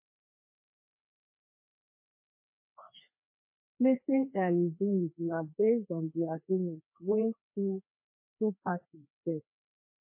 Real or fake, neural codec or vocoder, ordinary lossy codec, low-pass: fake; codec, 16 kHz, 2 kbps, FreqCodec, larger model; MP3, 24 kbps; 3.6 kHz